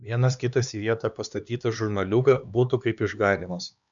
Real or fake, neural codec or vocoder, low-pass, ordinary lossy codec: fake; codec, 16 kHz, 2 kbps, X-Codec, HuBERT features, trained on LibriSpeech; 7.2 kHz; MP3, 96 kbps